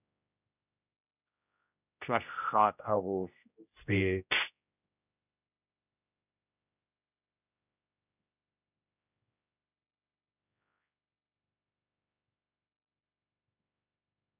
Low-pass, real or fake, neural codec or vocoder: 3.6 kHz; fake; codec, 16 kHz, 0.5 kbps, X-Codec, HuBERT features, trained on general audio